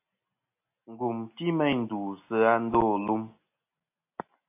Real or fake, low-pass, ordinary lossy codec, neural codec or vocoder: real; 3.6 kHz; AAC, 24 kbps; none